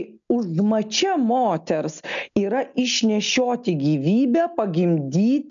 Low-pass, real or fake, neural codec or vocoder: 7.2 kHz; real; none